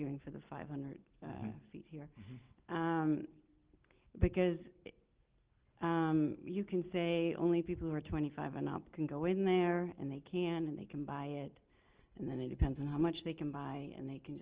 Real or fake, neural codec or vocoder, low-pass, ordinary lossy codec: real; none; 3.6 kHz; Opus, 16 kbps